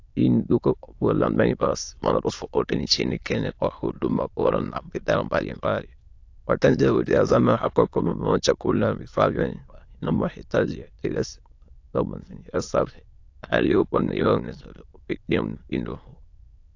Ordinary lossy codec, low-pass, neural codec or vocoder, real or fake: AAC, 48 kbps; 7.2 kHz; autoencoder, 22.05 kHz, a latent of 192 numbers a frame, VITS, trained on many speakers; fake